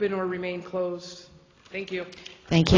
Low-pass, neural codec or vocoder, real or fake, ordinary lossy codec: 7.2 kHz; none; real; AAC, 32 kbps